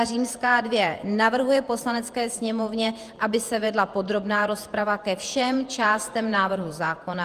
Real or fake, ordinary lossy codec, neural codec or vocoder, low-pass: fake; Opus, 32 kbps; vocoder, 48 kHz, 128 mel bands, Vocos; 14.4 kHz